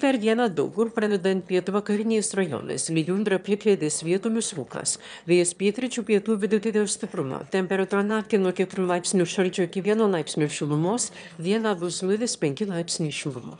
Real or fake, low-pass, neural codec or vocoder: fake; 9.9 kHz; autoencoder, 22.05 kHz, a latent of 192 numbers a frame, VITS, trained on one speaker